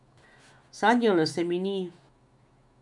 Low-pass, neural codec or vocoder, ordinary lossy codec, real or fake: 10.8 kHz; autoencoder, 48 kHz, 128 numbers a frame, DAC-VAE, trained on Japanese speech; none; fake